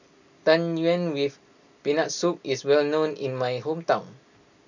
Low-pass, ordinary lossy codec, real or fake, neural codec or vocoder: 7.2 kHz; none; real; none